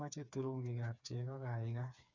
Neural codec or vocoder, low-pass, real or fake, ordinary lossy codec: codec, 16 kHz, 4 kbps, FreqCodec, smaller model; 7.2 kHz; fake; none